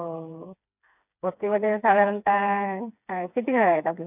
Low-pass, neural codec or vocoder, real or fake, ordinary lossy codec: 3.6 kHz; codec, 16 kHz, 4 kbps, FreqCodec, smaller model; fake; none